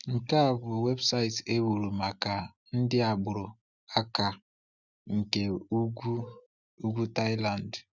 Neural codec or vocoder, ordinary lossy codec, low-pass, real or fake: none; none; 7.2 kHz; real